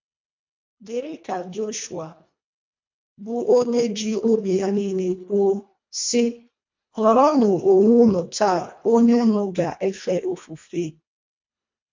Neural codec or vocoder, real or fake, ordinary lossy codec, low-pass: codec, 24 kHz, 1.5 kbps, HILCodec; fake; MP3, 48 kbps; 7.2 kHz